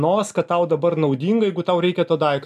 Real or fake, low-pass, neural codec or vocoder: real; 14.4 kHz; none